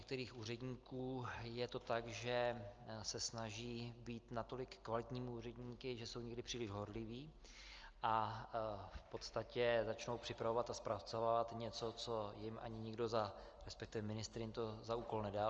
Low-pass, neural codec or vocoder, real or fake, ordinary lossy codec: 7.2 kHz; none; real; Opus, 24 kbps